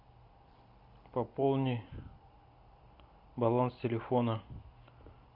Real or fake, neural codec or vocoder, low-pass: real; none; 5.4 kHz